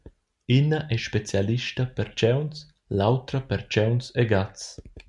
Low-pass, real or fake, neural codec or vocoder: 10.8 kHz; real; none